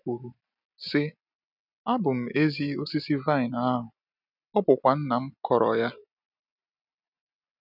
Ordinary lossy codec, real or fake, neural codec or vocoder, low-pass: none; real; none; 5.4 kHz